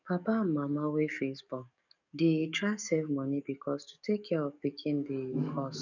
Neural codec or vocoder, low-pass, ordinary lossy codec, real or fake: codec, 44.1 kHz, 7.8 kbps, DAC; 7.2 kHz; none; fake